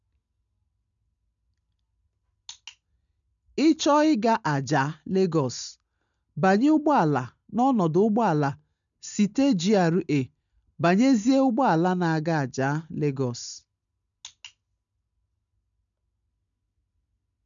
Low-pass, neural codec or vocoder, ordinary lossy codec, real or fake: 7.2 kHz; none; none; real